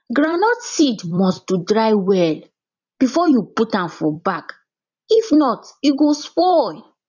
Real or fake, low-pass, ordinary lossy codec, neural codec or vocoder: fake; 7.2 kHz; none; vocoder, 44.1 kHz, 128 mel bands every 256 samples, BigVGAN v2